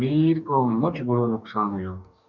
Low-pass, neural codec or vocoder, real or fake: 7.2 kHz; codec, 44.1 kHz, 2.6 kbps, DAC; fake